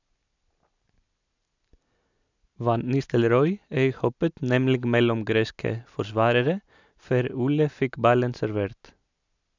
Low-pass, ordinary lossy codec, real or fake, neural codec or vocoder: 7.2 kHz; none; real; none